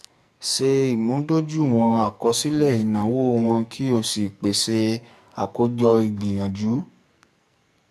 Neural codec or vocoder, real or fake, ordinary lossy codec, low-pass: codec, 44.1 kHz, 2.6 kbps, SNAC; fake; none; 14.4 kHz